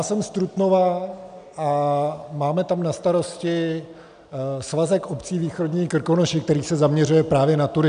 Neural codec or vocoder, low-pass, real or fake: none; 9.9 kHz; real